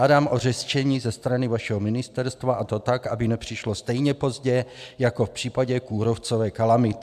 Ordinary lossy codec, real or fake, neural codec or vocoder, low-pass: AAC, 96 kbps; real; none; 14.4 kHz